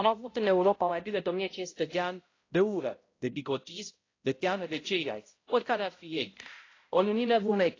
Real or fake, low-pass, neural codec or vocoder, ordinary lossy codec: fake; 7.2 kHz; codec, 16 kHz, 0.5 kbps, X-Codec, HuBERT features, trained on balanced general audio; AAC, 32 kbps